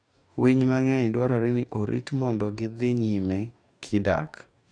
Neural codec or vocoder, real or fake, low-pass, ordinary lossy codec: codec, 44.1 kHz, 2.6 kbps, DAC; fake; 9.9 kHz; none